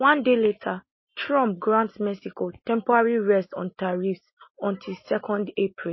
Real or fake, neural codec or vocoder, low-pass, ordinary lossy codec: real; none; 7.2 kHz; MP3, 24 kbps